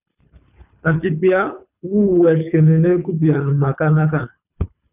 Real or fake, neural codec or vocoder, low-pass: fake; codec, 24 kHz, 3 kbps, HILCodec; 3.6 kHz